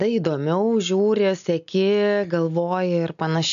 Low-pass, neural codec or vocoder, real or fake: 7.2 kHz; none; real